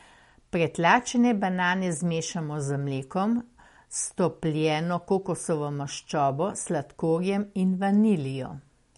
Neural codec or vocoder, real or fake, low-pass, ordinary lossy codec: none; real; 19.8 kHz; MP3, 48 kbps